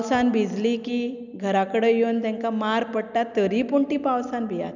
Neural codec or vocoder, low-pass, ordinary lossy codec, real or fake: none; 7.2 kHz; none; real